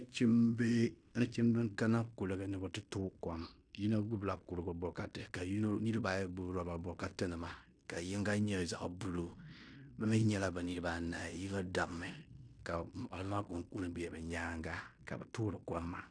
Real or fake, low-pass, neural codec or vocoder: fake; 9.9 kHz; codec, 16 kHz in and 24 kHz out, 0.9 kbps, LongCat-Audio-Codec, fine tuned four codebook decoder